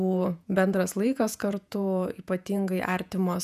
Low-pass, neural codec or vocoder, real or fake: 14.4 kHz; vocoder, 48 kHz, 128 mel bands, Vocos; fake